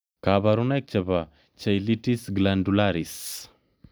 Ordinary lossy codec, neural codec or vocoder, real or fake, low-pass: none; none; real; none